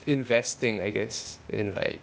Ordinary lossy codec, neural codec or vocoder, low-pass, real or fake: none; codec, 16 kHz, 0.8 kbps, ZipCodec; none; fake